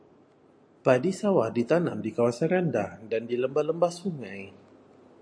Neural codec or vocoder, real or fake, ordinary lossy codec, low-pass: none; real; MP3, 48 kbps; 9.9 kHz